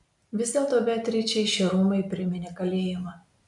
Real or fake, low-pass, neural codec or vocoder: real; 10.8 kHz; none